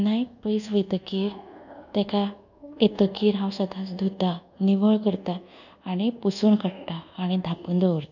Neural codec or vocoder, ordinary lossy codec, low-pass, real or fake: codec, 24 kHz, 1.2 kbps, DualCodec; none; 7.2 kHz; fake